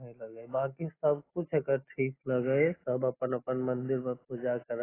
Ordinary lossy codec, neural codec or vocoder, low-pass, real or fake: AAC, 16 kbps; none; 3.6 kHz; real